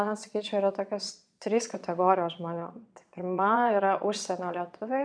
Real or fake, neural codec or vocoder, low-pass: fake; vocoder, 22.05 kHz, 80 mel bands, Vocos; 9.9 kHz